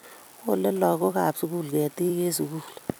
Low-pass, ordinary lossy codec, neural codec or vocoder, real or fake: none; none; vocoder, 44.1 kHz, 128 mel bands every 256 samples, BigVGAN v2; fake